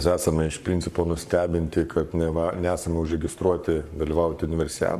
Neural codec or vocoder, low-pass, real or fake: codec, 44.1 kHz, 7.8 kbps, Pupu-Codec; 14.4 kHz; fake